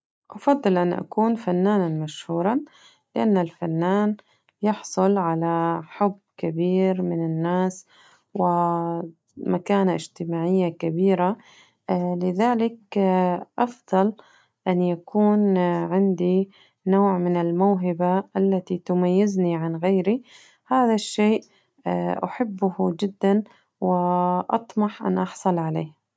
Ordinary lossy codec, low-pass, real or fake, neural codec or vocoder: none; none; real; none